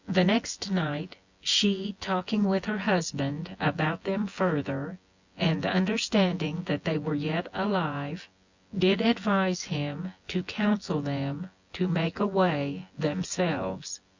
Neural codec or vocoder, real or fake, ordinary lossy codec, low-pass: vocoder, 24 kHz, 100 mel bands, Vocos; fake; Opus, 64 kbps; 7.2 kHz